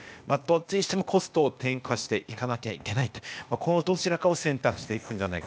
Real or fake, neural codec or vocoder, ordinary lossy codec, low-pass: fake; codec, 16 kHz, 0.8 kbps, ZipCodec; none; none